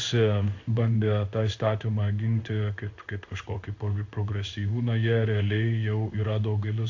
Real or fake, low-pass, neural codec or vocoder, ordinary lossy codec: fake; 7.2 kHz; codec, 16 kHz in and 24 kHz out, 1 kbps, XY-Tokenizer; AAC, 48 kbps